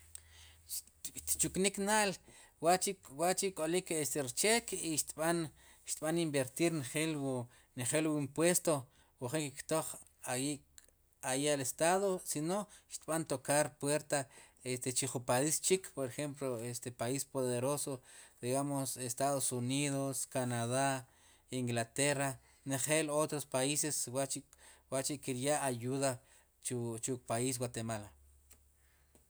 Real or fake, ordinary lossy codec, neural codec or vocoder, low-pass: real; none; none; none